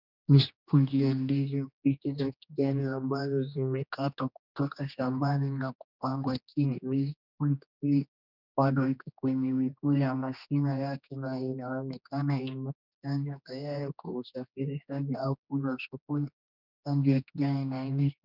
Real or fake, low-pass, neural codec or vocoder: fake; 5.4 kHz; codec, 16 kHz, 2 kbps, X-Codec, HuBERT features, trained on general audio